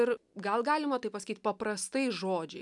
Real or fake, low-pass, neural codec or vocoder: real; 10.8 kHz; none